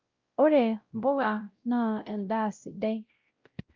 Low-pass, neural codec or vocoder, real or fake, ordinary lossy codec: 7.2 kHz; codec, 16 kHz, 0.5 kbps, X-Codec, WavLM features, trained on Multilingual LibriSpeech; fake; Opus, 24 kbps